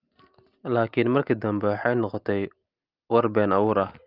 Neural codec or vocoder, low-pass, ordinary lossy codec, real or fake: none; 5.4 kHz; Opus, 32 kbps; real